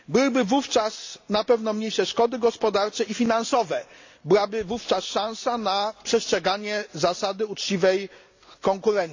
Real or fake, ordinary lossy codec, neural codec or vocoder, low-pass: real; AAC, 48 kbps; none; 7.2 kHz